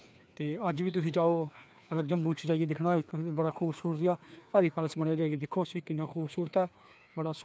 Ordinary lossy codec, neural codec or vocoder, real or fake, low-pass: none; codec, 16 kHz, 2 kbps, FreqCodec, larger model; fake; none